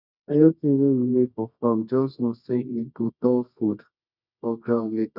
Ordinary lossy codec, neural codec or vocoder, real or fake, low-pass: none; codec, 24 kHz, 0.9 kbps, WavTokenizer, medium music audio release; fake; 5.4 kHz